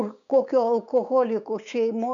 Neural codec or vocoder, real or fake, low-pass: codec, 16 kHz, 6 kbps, DAC; fake; 7.2 kHz